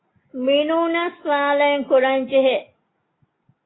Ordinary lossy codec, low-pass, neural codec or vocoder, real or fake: AAC, 16 kbps; 7.2 kHz; none; real